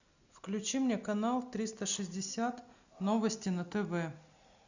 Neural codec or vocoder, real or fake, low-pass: none; real; 7.2 kHz